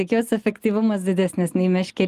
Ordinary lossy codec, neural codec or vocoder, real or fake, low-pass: Opus, 32 kbps; none; real; 14.4 kHz